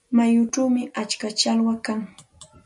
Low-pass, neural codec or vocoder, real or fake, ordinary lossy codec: 10.8 kHz; none; real; MP3, 96 kbps